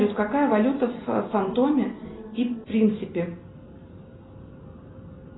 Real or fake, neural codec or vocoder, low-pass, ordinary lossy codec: real; none; 7.2 kHz; AAC, 16 kbps